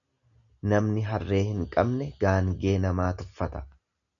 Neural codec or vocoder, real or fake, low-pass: none; real; 7.2 kHz